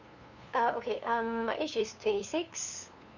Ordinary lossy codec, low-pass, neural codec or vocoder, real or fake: none; 7.2 kHz; codec, 16 kHz, 2 kbps, FunCodec, trained on LibriTTS, 25 frames a second; fake